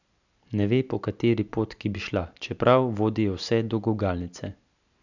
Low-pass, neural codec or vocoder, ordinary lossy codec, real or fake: 7.2 kHz; none; none; real